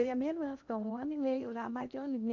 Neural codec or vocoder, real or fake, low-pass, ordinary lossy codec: codec, 16 kHz in and 24 kHz out, 0.8 kbps, FocalCodec, streaming, 65536 codes; fake; 7.2 kHz; none